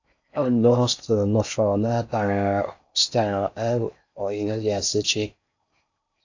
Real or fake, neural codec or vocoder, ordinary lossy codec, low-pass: fake; codec, 16 kHz in and 24 kHz out, 0.6 kbps, FocalCodec, streaming, 4096 codes; AAC, 48 kbps; 7.2 kHz